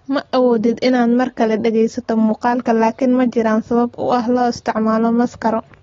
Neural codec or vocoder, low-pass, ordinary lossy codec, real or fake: none; 7.2 kHz; AAC, 32 kbps; real